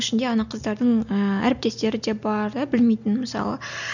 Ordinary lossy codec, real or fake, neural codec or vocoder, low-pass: none; real; none; 7.2 kHz